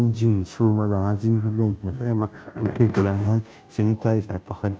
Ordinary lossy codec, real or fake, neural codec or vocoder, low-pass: none; fake; codec, 16 kHz, 0.5 kbps, FunCodec, trained on Chinese and English, 25 frames a second; none